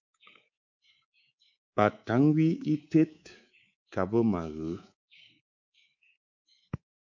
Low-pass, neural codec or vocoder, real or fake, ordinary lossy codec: 7.2 kHz; codec, 24 kHz, 3.1 kbps, DualCodec; fake; MP3, 64 kbps